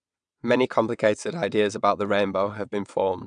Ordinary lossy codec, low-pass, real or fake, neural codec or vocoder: none; 9.9 kHz; fake; vocoder, 22.05 kHz, 80 mel bands, WaveNeXt